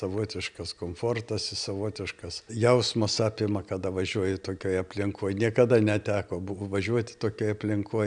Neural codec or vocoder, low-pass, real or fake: none; 9.9 kHz; real